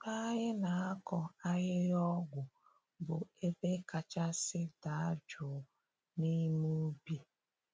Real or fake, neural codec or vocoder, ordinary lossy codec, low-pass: real; none; none; none